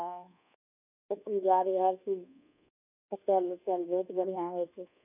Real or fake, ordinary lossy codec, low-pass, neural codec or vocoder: fake; none; 3.6 kHz; codec, 24 kHz, 1.2 kbps, DualCodec